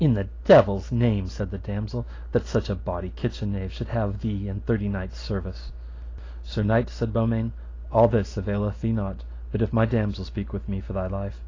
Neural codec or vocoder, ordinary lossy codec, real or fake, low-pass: none; AAC, 32 kbps; real; 7.2 kHz